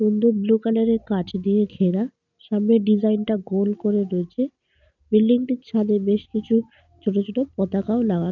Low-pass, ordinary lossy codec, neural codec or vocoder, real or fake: 7.2 kHz; none; none; real